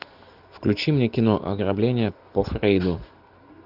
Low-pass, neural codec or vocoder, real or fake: 5.4 kHz; none; real